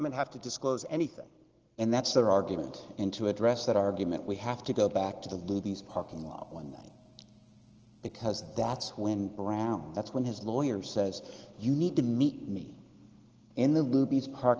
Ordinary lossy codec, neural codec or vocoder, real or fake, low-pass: Opus, 16 kbps; none; real; 7.2 kHz